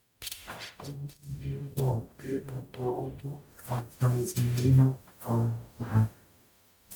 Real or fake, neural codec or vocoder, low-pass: fake; codec, 44.1 kHz, 0.9 kbps, DAC; 19.8 kHz